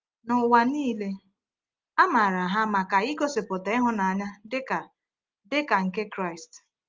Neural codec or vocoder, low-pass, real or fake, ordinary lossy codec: none; 7.2 kHz; real; Opus, 32 kbps